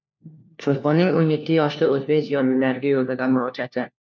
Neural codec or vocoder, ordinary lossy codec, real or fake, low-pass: codec, 16 kHz, 1 kbps, FunCodec, trained on LibriTTS, 50 frames a second; MP3, 64 kbps; fake; 7.2 kHz